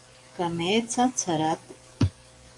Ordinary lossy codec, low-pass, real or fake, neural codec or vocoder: AAC, 64 kbps; 10.8 kHz; fake; codec, 44.1 kHz, 7.8 kbps, DAC